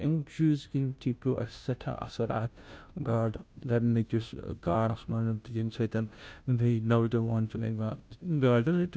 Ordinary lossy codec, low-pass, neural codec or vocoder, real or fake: none; none; codec, 16 kHz, 0.5 kbps, FunCodec, trained on Chinese and English, 25 frames a second; fake